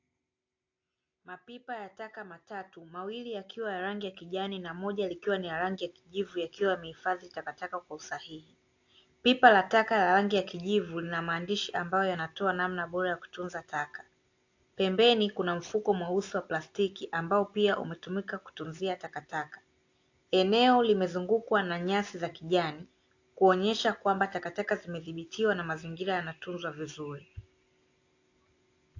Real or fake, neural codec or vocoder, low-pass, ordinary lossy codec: real; none; 7.2 kHz; AAC, 48 kbps